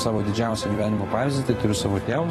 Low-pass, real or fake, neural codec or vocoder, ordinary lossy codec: 19.8 kHz; fake; vocoder, 44.1 kHz, 128 mel bands every 256 samples, BigVGAN v2; AAC, 32 kbps